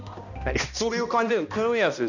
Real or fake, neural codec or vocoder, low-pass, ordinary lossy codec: fake; codec, 16 kHz, 1 kbps, X-Codec, HuBERT features, trained on balanced general audio; 7.2 kHz; none